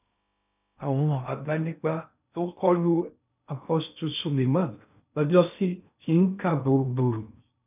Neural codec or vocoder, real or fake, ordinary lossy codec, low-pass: codec, 16 kHz in and 24 kHz out, 0.6 kbps, FocalCodec, streaming, 2048 codes; fake; none; 3.6 kHz